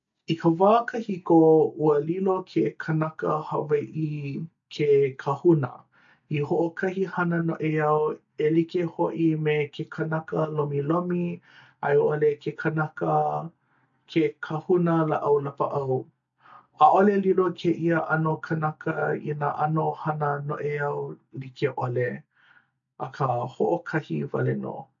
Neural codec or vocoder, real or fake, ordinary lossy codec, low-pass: none; real; AAC, 64 kbps; 7.2 kHz